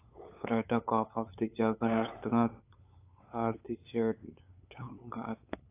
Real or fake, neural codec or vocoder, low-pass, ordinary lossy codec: fake; codec, 16 kHz, 8 kbps, FunCodec, trained on LibriTTS, 25 frames a second; 3.6 kHz; AAC, 24 kbps